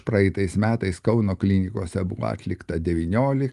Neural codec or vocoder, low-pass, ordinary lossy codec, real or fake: none; 10.8 kHz; Opus, 32 kbps; real